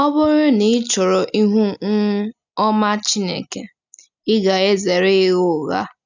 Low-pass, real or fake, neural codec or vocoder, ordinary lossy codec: 7.2 kHz; real; none; none